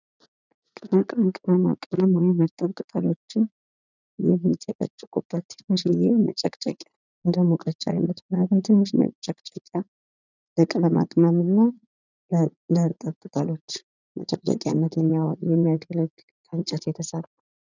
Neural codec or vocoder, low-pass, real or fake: vocoder, 44.1 kHz, 80 mel bands, Vocos; 7.2 kHz; fake